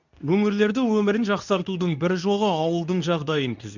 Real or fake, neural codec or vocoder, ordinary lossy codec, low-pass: fake; codec, 24 kHz, 0.9 kbps, WavTokenizer, medium speech release version 2; none; 7.2 kHz